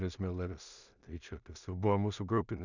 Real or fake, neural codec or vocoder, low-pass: fake; codec, 16 kHz in and 24 kHz out, 0.4 kbps, LongCat-Audio-Codec, two codebook decoder; 7.2 kHz